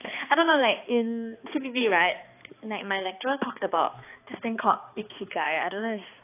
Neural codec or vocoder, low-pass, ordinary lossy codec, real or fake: codec, 16 kHz, 2 kbps, X-Codec, HuBERT features, trained on balanced general audio; 3.6 kHz; AAC, 24 kbps; fake